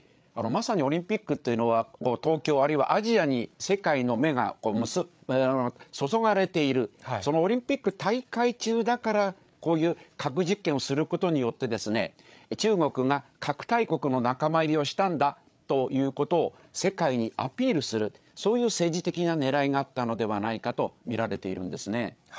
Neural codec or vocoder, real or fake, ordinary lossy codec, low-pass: codec, 16 kHz, 8 kbps, FreqCodec, larger model; fake; none; none